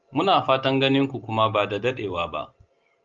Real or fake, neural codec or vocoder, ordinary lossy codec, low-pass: real; none; Opus, 24 kbps; 7.2 kHz